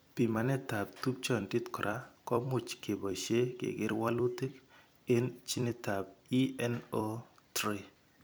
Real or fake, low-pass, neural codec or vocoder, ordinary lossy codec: fake; none; vocoder, 44.1 kHz, 128 mel bands every 256 samples, BigVGAN v2; none